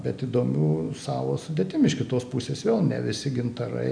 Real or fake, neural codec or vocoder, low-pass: real; none; 9.9 kHz